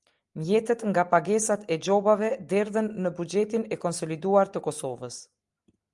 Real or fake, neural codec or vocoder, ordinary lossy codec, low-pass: real; none; Opus, 32 kbps; 10.8 kHz